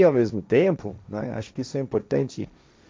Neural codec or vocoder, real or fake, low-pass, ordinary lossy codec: codec, 16 kHz, 1.1 kbps, Voila-Tokenizer; fake; none; none